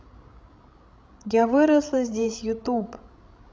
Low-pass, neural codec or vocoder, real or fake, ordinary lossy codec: none; codec, 16 kHz, 16 kbps, FreqCodec, larger model; fake; none